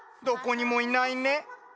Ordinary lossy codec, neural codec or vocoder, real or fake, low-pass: none; none; real; none